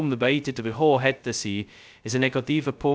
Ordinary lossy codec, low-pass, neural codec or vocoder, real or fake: none; none; codec, 16 kHz, 0.2 kbps, FocalCodec; fake